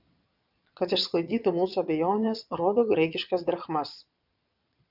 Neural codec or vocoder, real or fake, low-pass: vocoder, 22.05 kHz, 80 mel bands, Vocos; fake; 5.4 kHz